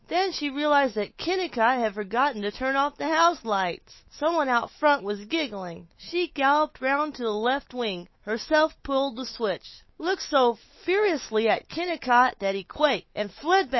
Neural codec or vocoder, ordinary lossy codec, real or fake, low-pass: autoencoder, 48 kHz, 128 numbers a frame, DAC-VAE, trained on Japanese speech; MP3, 24 kbps; fake; 7.2 kHz